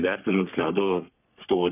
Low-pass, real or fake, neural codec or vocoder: 3.6 kHz; fake; codec, 44.1 kHz, 3.4 kbps, Pupu-Codec